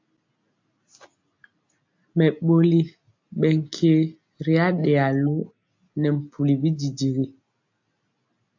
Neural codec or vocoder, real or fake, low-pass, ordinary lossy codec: none; real; 7.2 kHz; AAC, 48 kbps